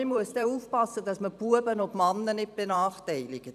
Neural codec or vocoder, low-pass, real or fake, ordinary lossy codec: vocoder, 44.1 kHz, 128 mel bands every 512 samples, BigVGAN v2; 14.4 kHz; fake; none